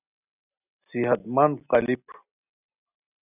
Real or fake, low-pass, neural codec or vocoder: real; 3.6 kHz; none